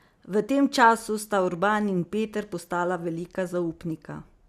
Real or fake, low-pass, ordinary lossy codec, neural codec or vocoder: real; 14.4 kHz; Opus, 64 kbps; none